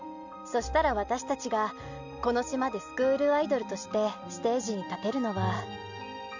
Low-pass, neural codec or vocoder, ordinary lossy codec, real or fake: 7.2 kHz; none; none; real